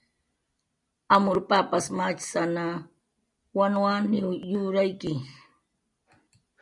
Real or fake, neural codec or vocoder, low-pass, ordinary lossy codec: real; none; 10.8 kHz; MP3, 96 kbps